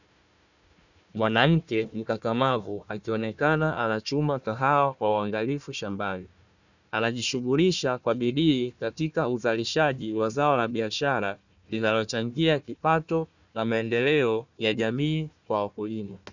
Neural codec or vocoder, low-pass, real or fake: codec, 16 kHz, 1 kbps, FunCodec, trained on Chinese and English, 50 frames a second; 7.2 kHz; fake